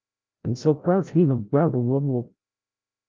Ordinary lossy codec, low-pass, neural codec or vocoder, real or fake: Opus, 24 kbps; 7.2 kHz; codec, 16 kHz, 0.5 kbps, FreqCodec, larger model; fake